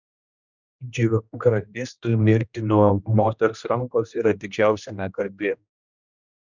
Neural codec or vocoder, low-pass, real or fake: codec, 16 kHz, 1 kbps, X-Codec, HuBERT features, trained on general audio; 7.2 kHz; fake